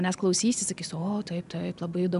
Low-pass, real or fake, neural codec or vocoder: 10.8 kHz; real; none